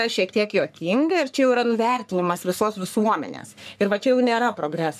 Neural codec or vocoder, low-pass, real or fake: codec, 44.1 kHz, 3.4 kbps, Pupu-Codec; 14.4 kHz; fake